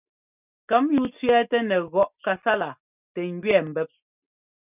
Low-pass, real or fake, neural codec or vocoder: 3.6 kHz; real; none